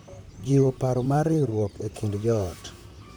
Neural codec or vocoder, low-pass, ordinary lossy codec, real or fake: vocoder, 44.1 kHz, 128 mel bands, Pupu-Vocoder; none; none; fake